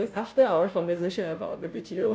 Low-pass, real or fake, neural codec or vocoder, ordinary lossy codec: none; fake; codec, 16 kHz, 0.5 kbps, FunCodec, trained on Chinese and English, 25 frames a second; none